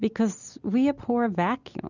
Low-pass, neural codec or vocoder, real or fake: 7.2 kHz; none; real